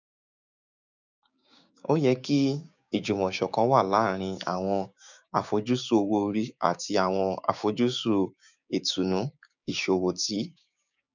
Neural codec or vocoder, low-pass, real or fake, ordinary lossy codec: codec, 16 kHz, 6 kbps, DAC; 7.2 kHz; fake; none